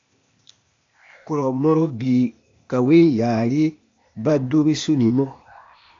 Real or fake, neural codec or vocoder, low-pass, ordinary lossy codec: fake; codec, 16 kHz, 0.8 kbps, ZipCodec; 7.2 kHz; AAC, 48 kbps